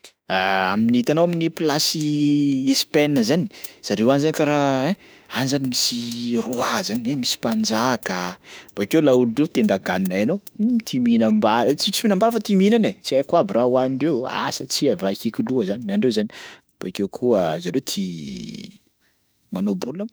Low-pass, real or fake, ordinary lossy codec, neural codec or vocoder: none; fake; none; autoencoder, 48 kHz, 32 numbers a frame, DAC-VAE, trained on Japanese speech